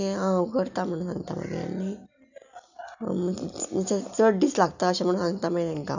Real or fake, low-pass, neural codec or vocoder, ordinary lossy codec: real; 7.2 kHz; none; none